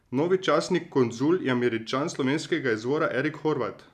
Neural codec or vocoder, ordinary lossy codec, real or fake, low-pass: none; none; real; 14.4 kHz